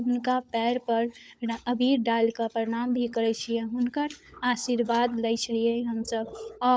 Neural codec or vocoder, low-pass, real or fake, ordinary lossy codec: codec, 16 kHz, 16 kbps, FunCodec, trained on LibriTTS, 50 frames a second; none; fake; none